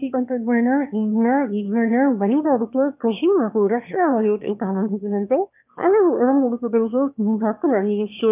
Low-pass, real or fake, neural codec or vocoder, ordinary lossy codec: 3.6 kHz; fake; autoencoder, 22.05 kHz, a latent of 192 numbers a frame, VITS, trained on one speaker; none